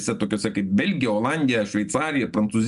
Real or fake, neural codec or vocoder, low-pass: real; none; 10.8 kHz